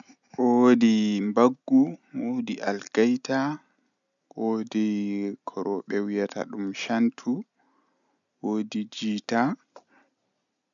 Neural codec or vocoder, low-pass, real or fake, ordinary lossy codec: none; 7.2 kHz; real; none